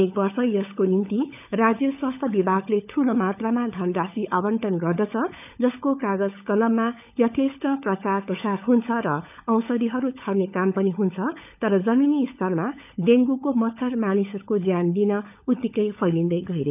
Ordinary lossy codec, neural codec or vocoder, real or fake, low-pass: none; codec, 16 kHz, 16 kbps, FunCodec, trained on LibriTTS, 50 frames a second; fake; 3.6 kHz